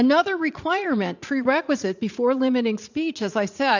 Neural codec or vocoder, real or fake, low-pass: vocoder, 44.1 kHz, 80 mel bands, Vocos; fake; 7.2 kHz